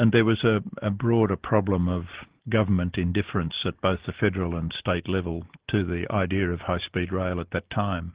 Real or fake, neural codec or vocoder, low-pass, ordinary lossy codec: real; none; 3.6 kHz; Opus, 16 kbps